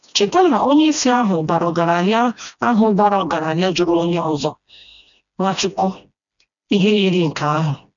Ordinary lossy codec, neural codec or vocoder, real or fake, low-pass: none; codec, 16 kHz, 1 kbps, FreqCodec, smaller model; fake; 7.2 kHz